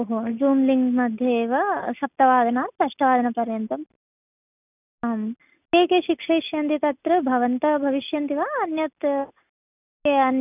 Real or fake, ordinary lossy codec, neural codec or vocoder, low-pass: real; none; none; 3.6 kHz